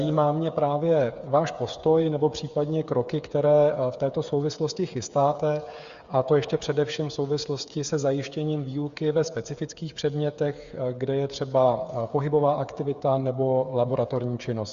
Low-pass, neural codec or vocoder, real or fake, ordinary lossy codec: 7.2 kHz; codec, 16 kHz, 8 kbps, FreqCodec, smaller model; fake; Opus, 64 kbps